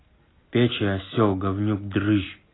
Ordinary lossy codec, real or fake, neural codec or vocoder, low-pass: AAC, 16 kbps; real; none; 7.2 kHz